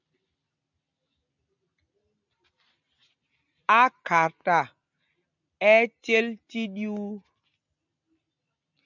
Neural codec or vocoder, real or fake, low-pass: none; real; 7.2 kHz